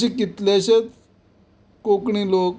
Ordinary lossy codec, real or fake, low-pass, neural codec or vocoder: none; real; none; none